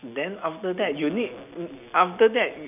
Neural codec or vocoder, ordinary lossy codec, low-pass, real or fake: none; none; 3.6 kHz; real